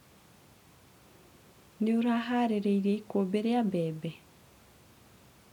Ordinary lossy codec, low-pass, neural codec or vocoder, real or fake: none; 19.8 kHz; none; real